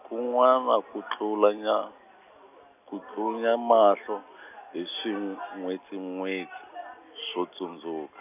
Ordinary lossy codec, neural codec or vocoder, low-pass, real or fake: none; none; 3.6 kHz; real